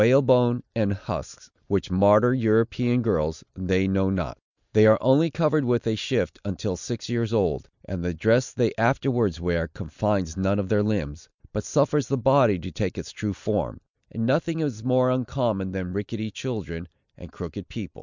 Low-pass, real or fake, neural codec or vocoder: 7.2 kHz; real; none